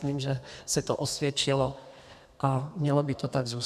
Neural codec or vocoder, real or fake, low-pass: codec, 32 kHz, 1.9 kbps, SNAC; fake; 14.4 kHz